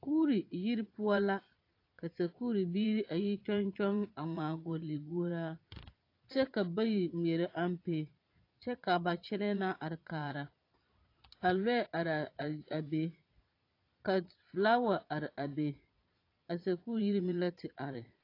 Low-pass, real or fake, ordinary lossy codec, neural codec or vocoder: 5.4 kHz; fake; AAC, 32 kbps; vocoder, 22.05 kHz, 80 mel bands, Vocos